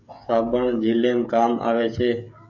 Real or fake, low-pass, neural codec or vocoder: fake; 7.2 kHz; codec, 16 kHz, 16 kbps, FreqCodec, smaller model